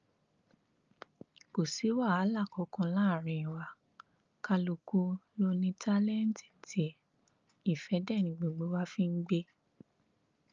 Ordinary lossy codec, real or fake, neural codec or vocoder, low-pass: Opus, 24 kbps; real; none; 7.2 kHz